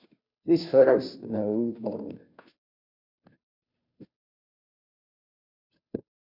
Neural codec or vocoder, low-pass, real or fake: codec, 16 kHz, 0.5 kbps, FunCodec, trained on Chinese and English, 25 frames a second; 5.4 kHz; fake